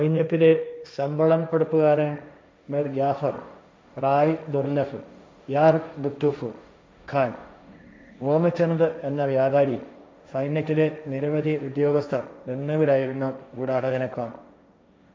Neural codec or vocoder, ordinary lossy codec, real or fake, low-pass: codec, 16 kHz, 1.1 kbps, Voila-Tokenizer; none; fake; none